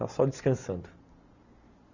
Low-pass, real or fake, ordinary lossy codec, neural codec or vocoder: 7.2 kHz; real; none; none